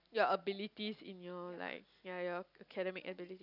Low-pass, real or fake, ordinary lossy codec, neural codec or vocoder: 5.4 kHz; real; none; none